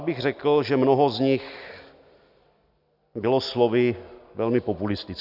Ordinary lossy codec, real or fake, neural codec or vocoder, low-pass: Opus, 64 kbps; real; none; 5.4 kHz